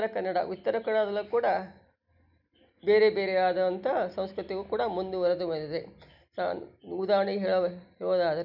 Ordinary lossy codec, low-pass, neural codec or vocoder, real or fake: none; 5.4 kHz; none; real